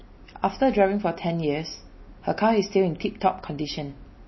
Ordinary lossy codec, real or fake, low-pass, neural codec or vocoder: MP3, 24 kbps; real; 7.2 kHz; none